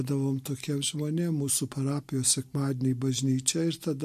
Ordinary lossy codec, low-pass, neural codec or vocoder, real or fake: MP3, 64 kbps; 14.4 kHz; none; real